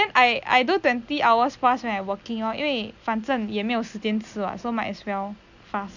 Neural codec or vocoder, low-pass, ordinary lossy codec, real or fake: none; 7.2 kHz; none; real